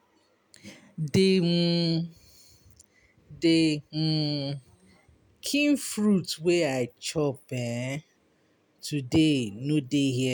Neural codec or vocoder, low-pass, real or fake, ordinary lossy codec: none; none; real; none